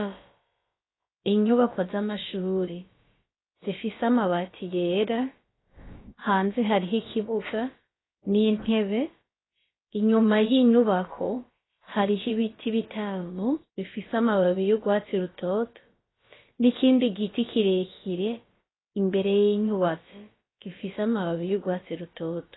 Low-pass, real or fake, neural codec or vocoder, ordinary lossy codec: 7.2 kHz; fake; codec, 16 kHz, about 1 kbps, DyCAST, with the encoder's durations; AAC, 16 kbps